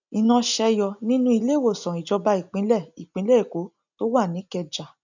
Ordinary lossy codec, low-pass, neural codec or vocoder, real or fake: none; 7.2 kHz; none; real